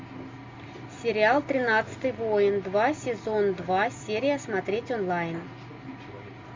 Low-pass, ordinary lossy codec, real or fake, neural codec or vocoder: 7.2 kHz; MP3, 48 kbps; real; none